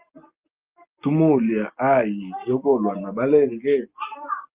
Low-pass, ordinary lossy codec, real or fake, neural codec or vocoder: 3.6 kHz; Opus, 24 kbps; real; none